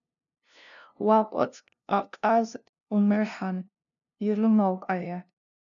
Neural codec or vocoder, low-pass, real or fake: codec, 16 kHz, 0.5 kbps, FunCodec, trained on LibriTTS, 25 frames a second; 7.2 kHz; fake